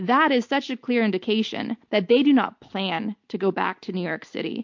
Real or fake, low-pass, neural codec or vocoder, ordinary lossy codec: real; 7.2 kHz; none; MP3, 48 kbps